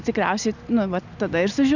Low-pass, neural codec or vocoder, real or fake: 7.2 kHz; none; real